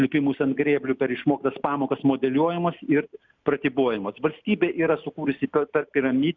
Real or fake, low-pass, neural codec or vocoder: real; 7.2 kHz; none